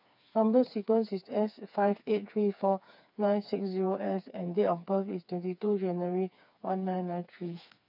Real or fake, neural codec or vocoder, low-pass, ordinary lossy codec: fake; codec, 16 kHz, 4 kbps, FreqCodec, smaller model; 5.4 kHz; MP3, 48 kbps